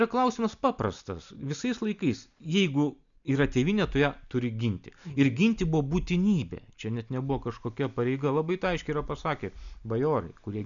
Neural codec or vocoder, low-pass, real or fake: none; 7.2 kHz; real